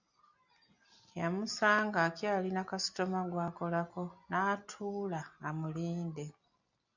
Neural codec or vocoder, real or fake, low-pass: none; real; 7.2 kHz